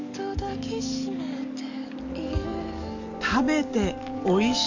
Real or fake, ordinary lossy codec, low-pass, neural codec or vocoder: fake; none; 7.2 kHz; codec, 44.1 kHz, 7.8 kbps, DAC